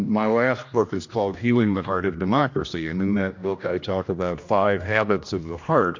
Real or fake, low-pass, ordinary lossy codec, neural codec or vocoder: fake; 7.2 kHz; MP3, 48 kbps; codec, 16 kHz, 1 kbps, X-Codec, HuBERT features, trained on general audio